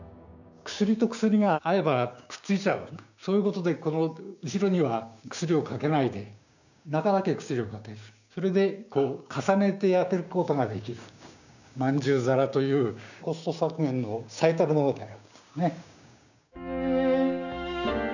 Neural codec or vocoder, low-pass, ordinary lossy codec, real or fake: codec, 16 kHz, 6 kbps, DAC; 7.2 kHz; none; fake